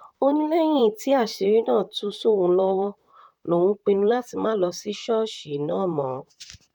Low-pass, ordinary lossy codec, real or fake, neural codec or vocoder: 19.8 kHz; none; fake; vocoder, 44.1 kHz, 128 mel bands, Pupu-Vocoder